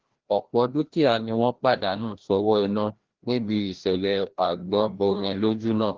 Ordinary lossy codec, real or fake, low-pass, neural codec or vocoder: Opus, 16 kbps; fake; 7.2 kHz; codec, 16 kHz, 1 kbps, FreqCodec, larger model